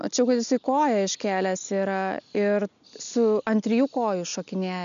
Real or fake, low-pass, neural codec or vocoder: real; 7.2 kHz; none